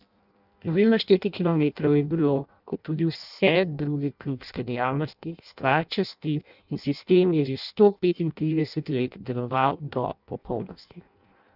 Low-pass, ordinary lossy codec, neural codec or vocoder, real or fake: 5.4 kHz; none; codec, 16 kHz in and 24 kHz out, 0.6 kbps, FireRedTTS-2 codec; fake